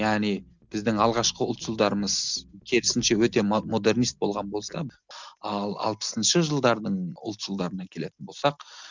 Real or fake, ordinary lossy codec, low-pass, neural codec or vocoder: real; none; 7.2 kHz; none